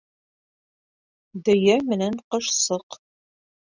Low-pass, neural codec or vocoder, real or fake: 7.2 kHz; none; real